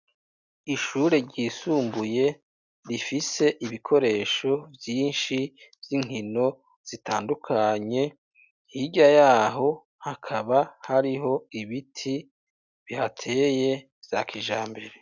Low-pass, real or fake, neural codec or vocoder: 7.2 kHz; real; none